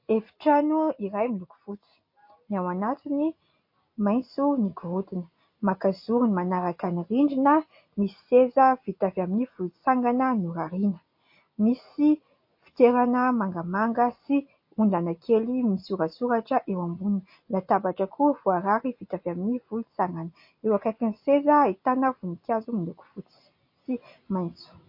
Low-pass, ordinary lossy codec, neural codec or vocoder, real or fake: 5.4 kHz; MP3, 32 kbps; none; real